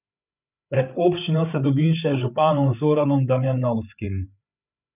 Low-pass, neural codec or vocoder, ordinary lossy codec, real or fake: 3.6 kHz; codec, 16 kHz, 8 kbps, FreqCodec, larger model; none; fake